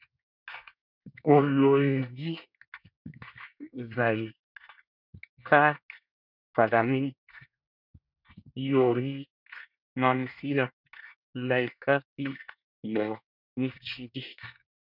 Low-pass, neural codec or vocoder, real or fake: 5.4 kHz; codec, 24 kHz, 1 kbps, SNAC; fake